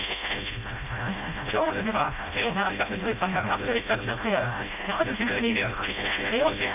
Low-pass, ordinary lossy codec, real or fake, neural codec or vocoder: 3.6 kHz; none; fake; codec, 16 kHz, 0.5 kbps, FreqCodec, smaller model